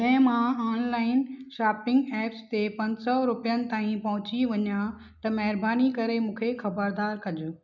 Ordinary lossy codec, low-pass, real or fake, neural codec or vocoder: none; 7.2 kHz; real; none